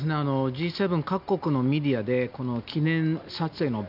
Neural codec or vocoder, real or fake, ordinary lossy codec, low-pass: none; real; none; 5.4 kHz